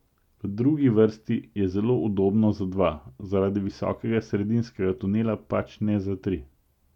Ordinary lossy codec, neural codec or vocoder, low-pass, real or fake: none; none; 19.8 kHz; real